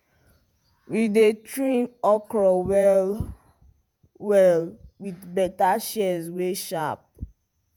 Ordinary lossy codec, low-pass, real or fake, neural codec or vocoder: none; none; fake; vocoder, 48 kHz, 128 mel bands, Vocos